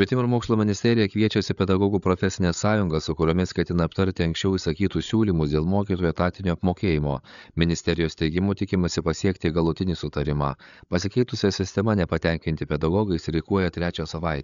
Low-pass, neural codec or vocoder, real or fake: 7.2 kHz; codec, 16 kHz, 8 kbps, FreqCodec, larger model; fake